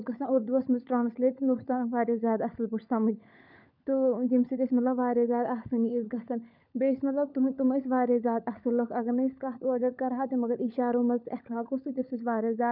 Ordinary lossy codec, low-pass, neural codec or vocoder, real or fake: none; 5.4 kHz; codec, 16 kHz, 16 kbps, FunCodec, trained on LibriTTS, 50 frames a second; fake